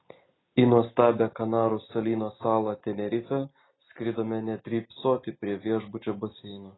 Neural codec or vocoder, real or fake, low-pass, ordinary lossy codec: none; real; 7.2 kHz; AAC, 16 kbps